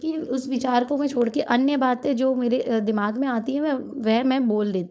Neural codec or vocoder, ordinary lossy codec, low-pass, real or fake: codec, 16 kHz, 4.8 kbps, FACodec; none; none; fake